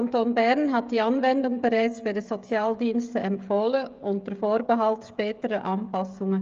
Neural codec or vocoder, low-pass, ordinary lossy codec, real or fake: codec, 16 kHz, 16 kbps, FreqCodec, smaller model; 7.2 kHz; Opus, 32 kbps; fake